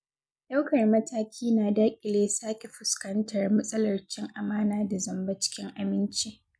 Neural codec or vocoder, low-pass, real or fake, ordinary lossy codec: none; none; real; none